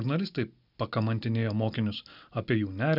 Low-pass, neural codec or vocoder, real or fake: 5.4 kHz; none; real